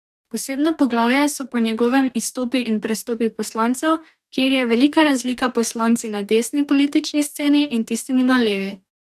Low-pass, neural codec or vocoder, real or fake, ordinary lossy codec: 14.4 kHz; codec, 44.1 kHz, 2.6 kbps, DAC; fake; none